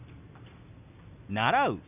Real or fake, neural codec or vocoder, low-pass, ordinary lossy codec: real; none; 3.6 kHz; none